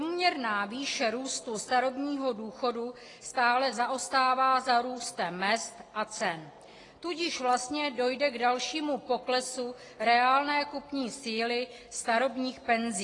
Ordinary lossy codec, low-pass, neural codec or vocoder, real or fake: AAC, 32 kbps; 10.8 kHz; none; real